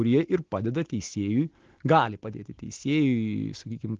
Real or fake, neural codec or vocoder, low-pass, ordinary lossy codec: real; none; 7.2 kHz; Opus, 16 kbps